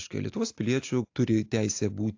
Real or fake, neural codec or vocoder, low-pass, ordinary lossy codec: real; none; 7.2 kHz; AAC, 48 kbps